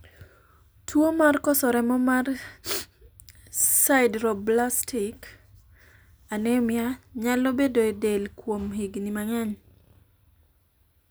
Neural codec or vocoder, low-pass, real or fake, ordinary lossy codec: none; none; real; none